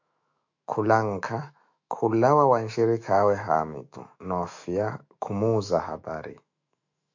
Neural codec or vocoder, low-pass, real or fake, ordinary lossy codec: autoencoder, 48 kHz, 128 numbers a frame, DAC-VAE, trained on Japanese speech; 7.2 kHz; fake; MP3, 64 kbps